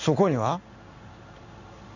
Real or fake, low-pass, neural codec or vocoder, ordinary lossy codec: fake; 7.2 kHz; codec, 16 kHz in and 24 kHz out, 1 kbps, XY-Tokenizer; none